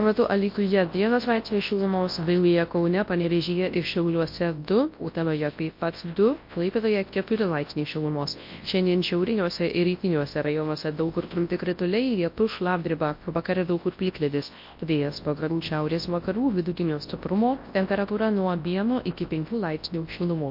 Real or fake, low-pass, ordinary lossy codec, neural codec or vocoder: fake; 5.4 kHz; MP3, 32 kbps; codec, 24 kHz, 0.9 kbps, WavTokenizer, large speech release